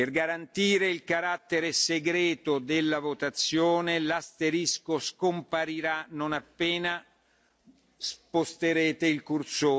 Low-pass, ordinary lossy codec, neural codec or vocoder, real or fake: none; none; none; real